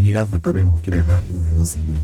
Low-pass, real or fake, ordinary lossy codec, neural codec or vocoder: 19.8 kHz; fake; none; codec, 44.1 kHz, 0.9 kbps, DAC